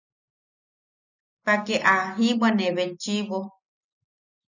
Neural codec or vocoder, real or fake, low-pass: none; real; 7.2 kHz